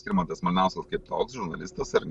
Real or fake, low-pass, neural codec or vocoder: real; 10.8 kHz; none